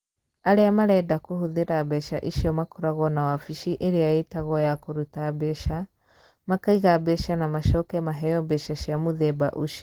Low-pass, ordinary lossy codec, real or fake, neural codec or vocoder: 19.8 kHz; Opus, 16 kbps; real; none